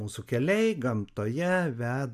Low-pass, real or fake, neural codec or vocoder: 14.4 kHz; real; none